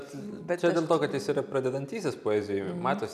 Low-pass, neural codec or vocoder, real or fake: 14.4 kHz; none; real